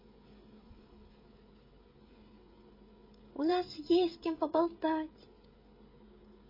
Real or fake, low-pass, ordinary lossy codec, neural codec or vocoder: fake; 5.4 kHz; MP3, 24 kbps; codec, 16 kHz, 16 kbps, FreqCodec, smaller model